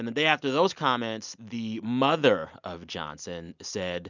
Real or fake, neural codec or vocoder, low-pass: real; none; 7.2 kHz